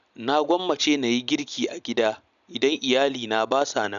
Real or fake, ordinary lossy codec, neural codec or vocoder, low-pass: real; none; none; 7.2 kHz